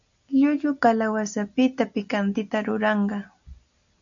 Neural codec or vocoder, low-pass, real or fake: none; 7.2 kHz; real